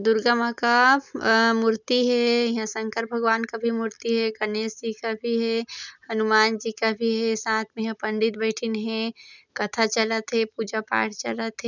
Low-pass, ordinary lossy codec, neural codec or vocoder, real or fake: 7.2 kHz; none; none; real